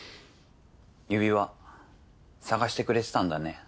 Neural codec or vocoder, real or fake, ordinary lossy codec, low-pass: none; real; none; none